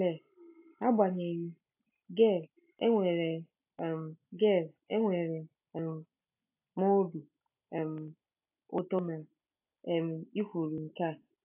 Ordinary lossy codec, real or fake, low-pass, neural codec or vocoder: AAC, 32 kbps; real; 3.6 kHz; none